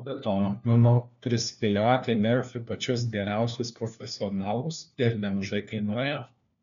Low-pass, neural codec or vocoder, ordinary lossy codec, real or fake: 7.2 kHz; codec, 16 kHz, 1 kbps, FunCodec, trained on LibriTTS, 50 frames a second; MP3, 96 kbps; fake